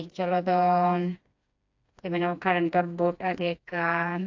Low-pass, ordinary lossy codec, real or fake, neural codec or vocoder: 7.2 kHz; AAC, 48 kbps; fake; codec, 16 kHz, 2 kbps, FreqCodec, smaller model